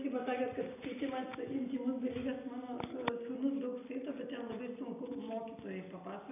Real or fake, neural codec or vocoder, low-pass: real; none; 3.6 kHz